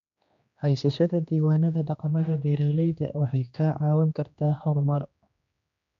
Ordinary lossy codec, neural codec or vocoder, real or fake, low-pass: MP3, 64 kbps; codec, 16 kHz, 2 kbps, X-Codec, HuBERT features, trained on general audio; fake; 7.2 kHz